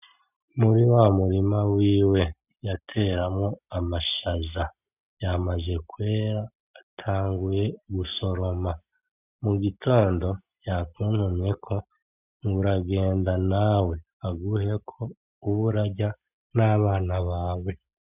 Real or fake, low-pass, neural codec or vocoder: real; 3.6 kHz; none